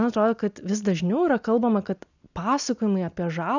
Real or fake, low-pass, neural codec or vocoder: real; 7.2 kHz; none